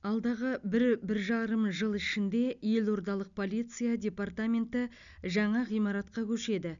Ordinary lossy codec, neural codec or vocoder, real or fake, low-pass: none; none; real; 7.2 kHz